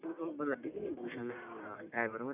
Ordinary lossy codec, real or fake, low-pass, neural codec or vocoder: none; fake; 3.6 kHz; codec, 44.1 kHz, 1.7 kbps, Pupu-Codec